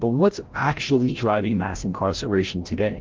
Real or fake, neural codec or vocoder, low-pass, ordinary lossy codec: fake; codec, 16 kHz, 0.5 kbps, FreqCodec, larger model; 7.2 kHz; Opus, 16 kbps